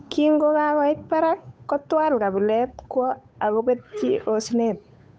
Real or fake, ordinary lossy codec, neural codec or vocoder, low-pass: fake; none; codec, 16 kHz, 8 kbps, FunCodec, trained on Chinese and English, 25 frames a second; none